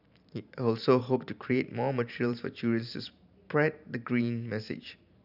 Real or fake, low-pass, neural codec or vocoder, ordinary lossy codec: fake; 5.4 kHz; vocoder, 44.1 kHz, 128 mel bands every 512 samples, BigVGAN v2; none